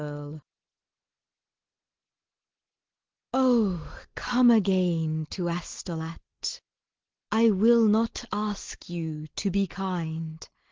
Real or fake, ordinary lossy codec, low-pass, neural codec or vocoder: real; Opus, 16 kbps; 7.2 kHz; none